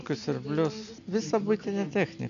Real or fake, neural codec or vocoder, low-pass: real; none; 7.2 kHz